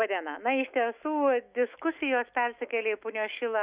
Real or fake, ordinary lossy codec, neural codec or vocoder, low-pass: fake; Opus, 64 kbps; autoencoder, 48 kHz, 128 numbers a frame, DAC-VAE, trained on Japanese speech; 3.6 kHz